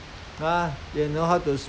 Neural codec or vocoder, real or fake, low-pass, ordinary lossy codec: none; real; none; none